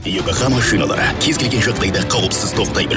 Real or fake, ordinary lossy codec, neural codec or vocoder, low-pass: real; none; none; none